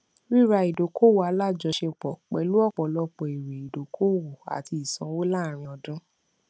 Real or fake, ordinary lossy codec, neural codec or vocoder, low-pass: real; none; none; none